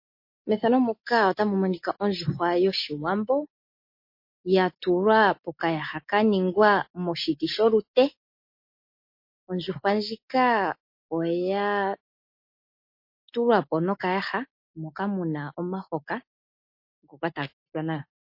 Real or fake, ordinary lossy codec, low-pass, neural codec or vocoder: real; MP3, 32 kbps; 5.4 kHz; none